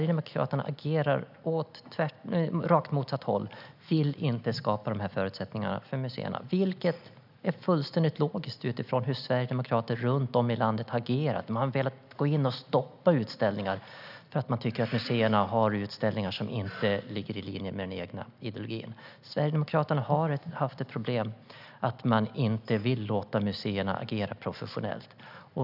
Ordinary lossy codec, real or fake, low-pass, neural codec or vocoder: none; real; 5.4 kHz; none